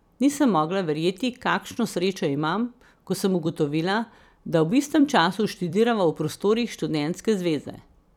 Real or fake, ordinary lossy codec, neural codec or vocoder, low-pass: fake; none; vocoder, 44.1 kHz, 128 mel bands every 256 samples, BigVGAN v2; 19.8 kHz